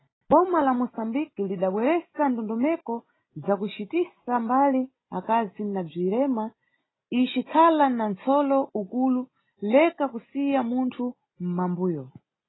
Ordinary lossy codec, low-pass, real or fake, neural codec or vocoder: AAC, 16 kbps; 7.2 kHz; real; none